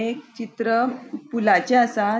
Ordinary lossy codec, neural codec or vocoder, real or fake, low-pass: none; none; real; none